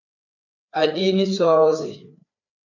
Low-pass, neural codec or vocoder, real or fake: 7.2 kHz; codec, 16 kHz, 4 kbps, FreqCodec, larger model; fake